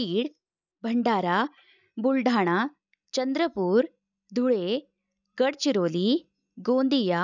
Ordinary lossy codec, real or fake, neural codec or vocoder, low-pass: none; real; none; 7.2 kHz